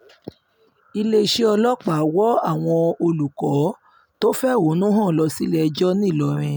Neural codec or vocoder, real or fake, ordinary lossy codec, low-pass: none; real; none; none